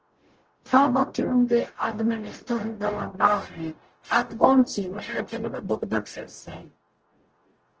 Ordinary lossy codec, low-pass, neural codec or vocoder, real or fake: Opus, 16 kbps; 7.2 kHz; codec, 44.1 kHz, 0.9 kbps, DAC; fake